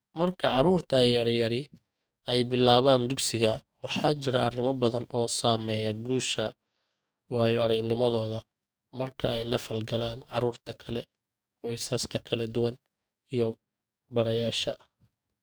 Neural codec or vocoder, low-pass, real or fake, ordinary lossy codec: codec, 44.1 kHz, 2.6 kbps, DAC; none; fake; none